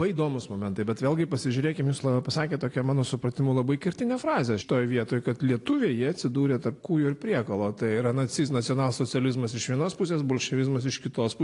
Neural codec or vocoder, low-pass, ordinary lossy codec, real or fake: none; 10.8 kHz; AAC, 48 kbps; real